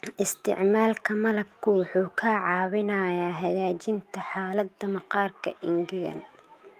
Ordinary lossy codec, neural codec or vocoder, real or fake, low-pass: Opus, 32 kbps; autoencoder, 48 kHz, 128 numbers a frame, DAC-VAE, trained on Japanese speech; fake; 14.4 kHz